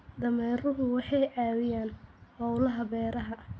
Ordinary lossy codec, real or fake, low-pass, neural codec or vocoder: none; real; none; none